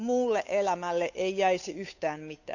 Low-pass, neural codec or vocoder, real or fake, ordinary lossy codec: 7.2 kHz; codec, 16 kHz, 8 kbps, FunCodec, trained on Chinese and English, 25 frames a second; fake; none